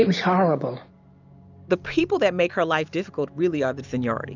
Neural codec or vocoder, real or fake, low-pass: none; real; 7.2 kHz